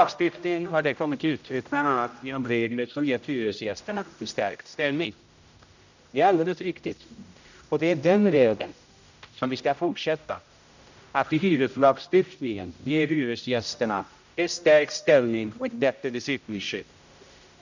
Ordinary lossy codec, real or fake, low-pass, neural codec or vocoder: none; fake; 7.2 kHz; codec, 16 kHz, 0.5 kbps, X-Codec, HuBERT features, trained on general audio